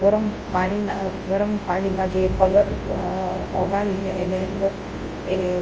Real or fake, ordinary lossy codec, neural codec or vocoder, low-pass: fake; Opus, 24 kbps; codec, 24 kHz, 0.9 kbps, WavTokenizer, large speech release; 7.2 kHz